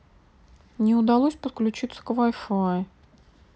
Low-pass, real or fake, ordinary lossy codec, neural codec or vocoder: none; real; none; none